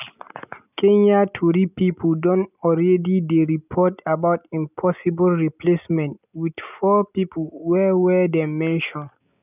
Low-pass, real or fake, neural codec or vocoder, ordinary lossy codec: 3.6 kHz; real; none; none